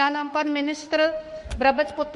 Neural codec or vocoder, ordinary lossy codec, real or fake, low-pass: autoencoder, 48 kHz, 32 numbers a frame, DAC-VAE, trained on Japanese speech; MP3, 48 kbps; fake; 14.4 kHz